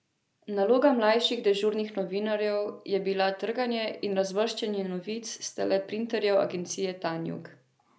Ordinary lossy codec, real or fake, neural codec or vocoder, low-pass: none; real; none; none